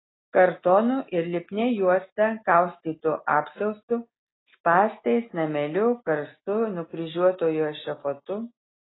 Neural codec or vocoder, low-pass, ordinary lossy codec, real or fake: none; 7.2 kHz; AAC, 16 kbps; real